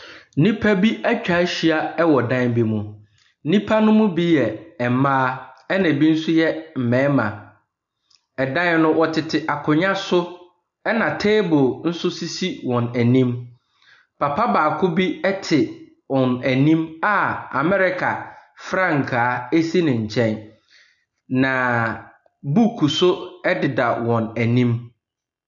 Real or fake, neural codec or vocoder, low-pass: real; none; 7.2 kHz